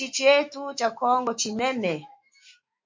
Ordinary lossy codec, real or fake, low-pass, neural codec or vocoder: MP3, 48 kbps; fake; 7.2 kHz; autoencoder, 48 kHz, 128 numbers a frame, DAC-VAE, trained on Japanese speech